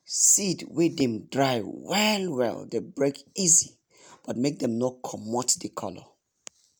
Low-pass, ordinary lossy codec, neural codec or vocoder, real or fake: none; none; none; real